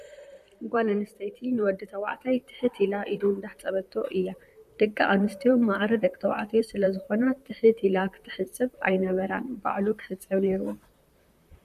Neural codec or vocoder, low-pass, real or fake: vocoder, 44.1 kHz, 128 mel bands, Pupu-Vocoder; 14.4 kHz; fake